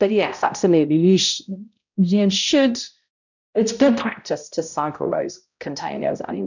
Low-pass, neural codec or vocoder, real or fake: 7.2 kHz; codec, 16 kHz, 0.5 kbps, X-Codec, HuBERT features, trained on balanced general audio; fake